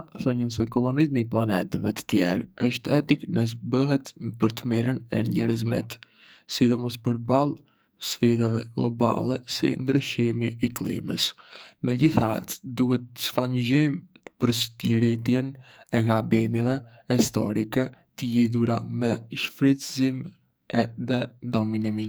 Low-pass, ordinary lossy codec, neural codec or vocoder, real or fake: none; none; codec, 44.1 kHz, 2.6 kbps, SNAC; fake